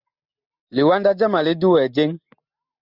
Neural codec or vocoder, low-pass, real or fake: none; 5.4 kHz; real